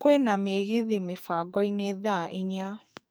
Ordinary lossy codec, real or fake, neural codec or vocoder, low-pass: none; fake; codec, 44.1 kHz, 2.6 kbps, SNAC; none